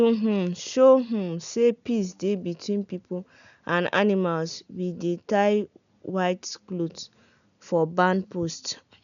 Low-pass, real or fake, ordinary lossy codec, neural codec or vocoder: 7.2 kHz; real; none; none